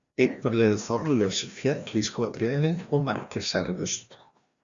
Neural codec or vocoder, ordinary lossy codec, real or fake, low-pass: codec, 16 kHz, 1 kbps, FreqCodec, larger model; Opus, 64 kbps; fake; 7.2 kHz